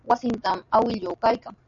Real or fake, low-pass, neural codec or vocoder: real; 7.2 kHz; none